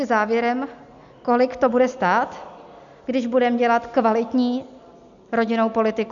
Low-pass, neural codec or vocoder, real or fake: 7.2 kHz; none; real